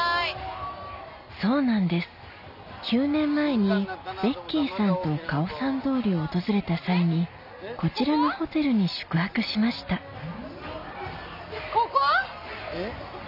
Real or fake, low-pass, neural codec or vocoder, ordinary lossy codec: real; 5.4 kHz; none; none